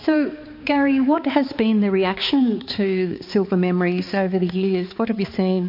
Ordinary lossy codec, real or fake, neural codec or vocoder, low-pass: MP3, 32 kbps; fake; codec, 16 kHz, 4 kbps, X-Codec, HuBERT features, trained on balanced general audio; 5.4 kHz